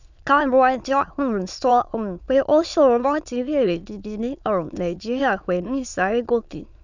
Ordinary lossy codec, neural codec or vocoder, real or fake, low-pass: none; autoencoder, 22.05 kHz, a latent of 192 numbers a frame, VITS, trained on many speakers; fake; 7.2 kHz